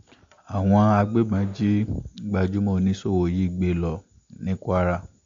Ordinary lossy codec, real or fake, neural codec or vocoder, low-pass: AAC, 48 kbps; real; none; 7.2 kHz